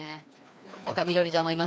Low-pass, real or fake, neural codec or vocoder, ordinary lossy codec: none; fake; codec, 16 kHz, 2 kbps, FreqCodec, larger model; none